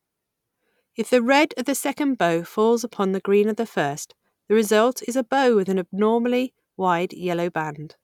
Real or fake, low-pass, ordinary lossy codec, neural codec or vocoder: real; 19.8 kHz; none; none